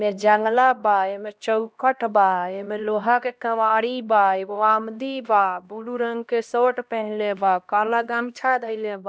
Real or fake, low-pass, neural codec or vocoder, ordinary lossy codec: fake; none; codec, 16 kHz, 1 kbps, X-Codec, HuBERT features, trained on LibriSpeech; none